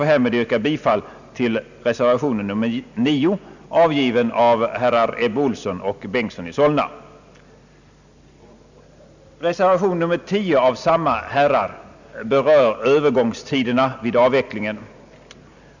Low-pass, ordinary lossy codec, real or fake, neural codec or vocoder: 7.2 kHz; none; real; none